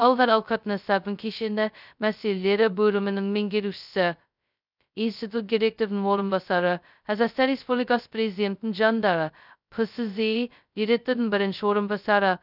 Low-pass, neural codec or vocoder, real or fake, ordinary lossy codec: 5.4 kHz; codec, 16 kHz, 0.2 kbps, FocalCodec; fake; none